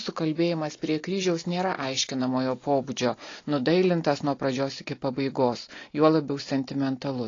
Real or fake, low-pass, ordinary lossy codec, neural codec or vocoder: real; 7.2 kHz; AAC, 32 kbps; none